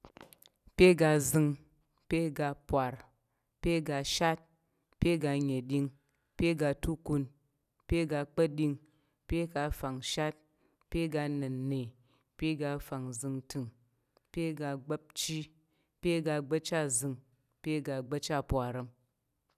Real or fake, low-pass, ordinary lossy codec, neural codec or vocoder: real; 14.4 kHz; none; none